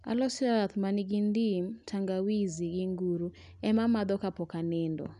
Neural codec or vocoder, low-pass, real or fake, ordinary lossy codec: none; 10.8 kHz; real; none